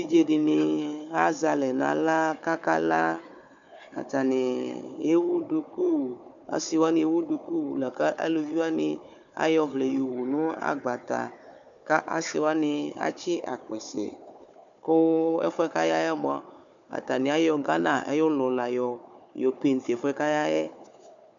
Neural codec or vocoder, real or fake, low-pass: codec, 16 kHz, 4 kbps, FunCodec, trained on Chinese and English, 50 frames a second; fake; 7.2 kHz